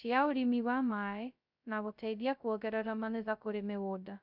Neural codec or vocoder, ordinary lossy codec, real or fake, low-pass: codec, 16 kHz, 0.2 kbps, FocalCodec; none; fake; 5.4 kHz